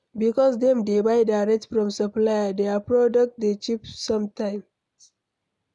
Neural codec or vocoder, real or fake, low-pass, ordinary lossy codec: none; real; 10.8 kHz; none